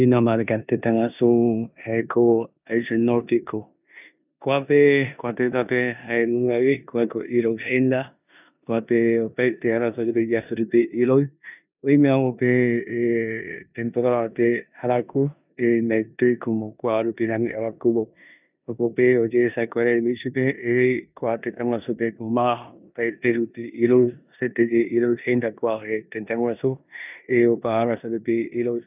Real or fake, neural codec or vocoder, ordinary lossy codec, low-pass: fake; codec, 16 kHz in and 24 kHz out, 0.9 kbps, LongCat-Audio-Codec, four codebook decoder; none; 3.6 kHz